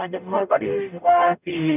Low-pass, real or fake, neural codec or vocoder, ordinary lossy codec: 3.6 kHz; fake; codec, 44.1 kHz, 0.9 kbps, DAC; none